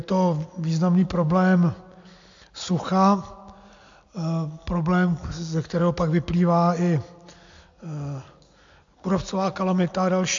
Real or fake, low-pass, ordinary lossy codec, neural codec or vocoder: real; 7.2 kHz; AAC, 64 kbps; none